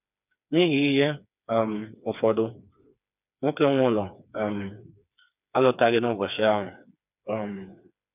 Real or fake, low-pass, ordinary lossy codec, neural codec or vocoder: fake; 3.6 kHz; none; codec, 16 kHz, 4 kbps, FreqCodec, smaller model